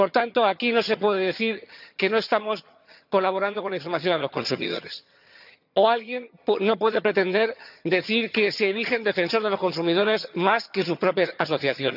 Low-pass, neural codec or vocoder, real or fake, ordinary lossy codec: 5.4 kHz; vocoder, 22.05 kHz, 80 mel bands, HiFi-GAN; fake; none